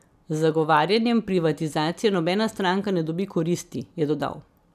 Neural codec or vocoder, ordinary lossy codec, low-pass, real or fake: none; none; 14.4 kHz; real